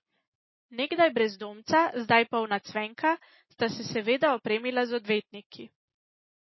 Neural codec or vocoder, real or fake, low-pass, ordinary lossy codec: none; real; 7.2 kHz; MP3, 24 kbps